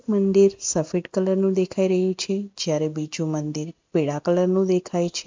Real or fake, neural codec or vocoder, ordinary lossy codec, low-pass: real; none; MP3, 64 kbps; 7.2 kHz